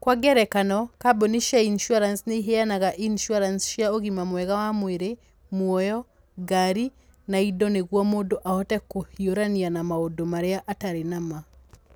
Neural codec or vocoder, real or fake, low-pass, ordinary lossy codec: none; real; none; none